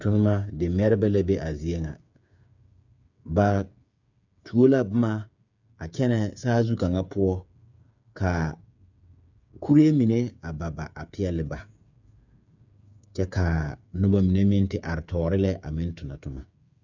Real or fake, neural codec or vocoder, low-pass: fake; codec, 16 kHz, 8 kbps, FreqCodec, smaller model; 7.2 kHz